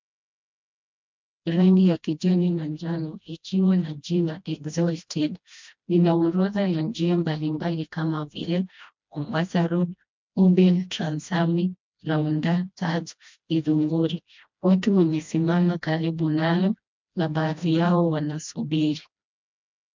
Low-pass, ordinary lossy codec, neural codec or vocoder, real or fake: 7.2 kHz; MP3, 64 kbps; codec, 16 kHz, 1 kbps, FreqCodec, smaller model; fake